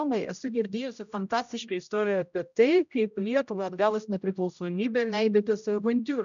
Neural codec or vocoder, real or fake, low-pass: codec, 16 kHz, 0.5 kbps, X-Codec, HuBERT features, trained on general audio; fake; 7.2 kHz